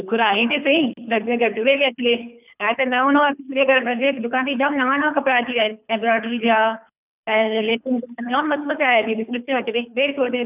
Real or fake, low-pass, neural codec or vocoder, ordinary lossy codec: fake; 3.6 kHz; codec, 24 kHz, 3 kbps, HILCodec; none